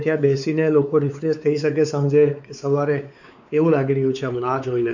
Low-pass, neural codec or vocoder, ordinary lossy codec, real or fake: 7.2 kHz; codec, 16 kHz, 4 kbps, X-Codec, WavLM features, trained on Multilingual LibriSpeech; none; fake